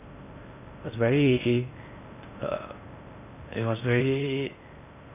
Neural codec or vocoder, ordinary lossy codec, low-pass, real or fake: codec, 16 kHz in and 24 kHz out, 0.6 kbps, FocalCodec, streaming, 4096 codes; none; 3.6 kHz; fake